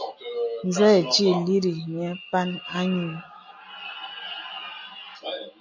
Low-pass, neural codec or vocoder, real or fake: 7.2 kHz; none; real